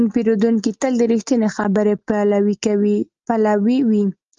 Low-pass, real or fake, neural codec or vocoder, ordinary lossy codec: 7.2 kHz; real; none; Opus, 32 kbps